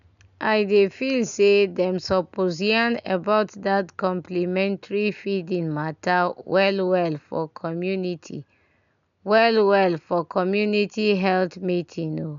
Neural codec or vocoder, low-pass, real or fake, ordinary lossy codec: none; 7.2 kHz; real; none